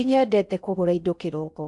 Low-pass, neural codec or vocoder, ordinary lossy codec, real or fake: 10.8 kHz; codec, 16 kHz in and 24 kHz out, 0.6 kbps, FocalCodec, streaming, 4096 codes; none; fake